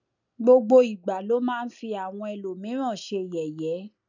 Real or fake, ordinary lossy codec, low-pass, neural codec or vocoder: real; none; 7.2 kHz; none